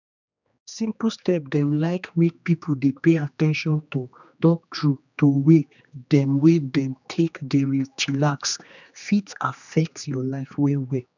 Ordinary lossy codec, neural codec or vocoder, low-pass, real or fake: none; codec, 16 kHz, 2 kbps, X-Codec, HuBERT features, trained on general audio; 7.2 kHz; fake